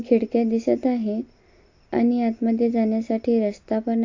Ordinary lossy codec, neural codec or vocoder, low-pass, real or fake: AAC, 48 kbps; none; 7.2 kHz; real